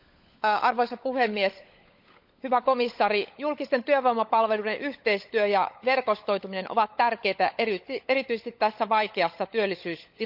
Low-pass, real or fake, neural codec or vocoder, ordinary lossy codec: 5.4 kHz; fake; codec, 16 kHz, 16 kbps, FunCodec, trained on LibriTTS, 50 frames a second; none